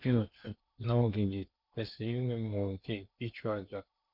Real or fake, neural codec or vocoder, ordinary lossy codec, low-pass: fake; codec, 16 kHz in and 24 kHz out, 0.8 kbps, FocalCodec, streaming, 65536 codes; none; 5.4 kHz